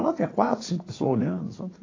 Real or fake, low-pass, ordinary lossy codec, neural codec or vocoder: fake; 7.2 kHz; AAC, 32 kbps; vocoder, 44.1 kHz, 80 mel bands, Vocos